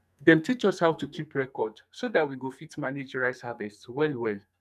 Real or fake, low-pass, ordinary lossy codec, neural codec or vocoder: fake; 14.4 kHz; none; codec, 44.1 kHz, 2.6 kbps, SNAC